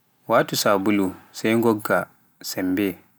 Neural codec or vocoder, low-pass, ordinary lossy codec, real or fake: none; none; none; real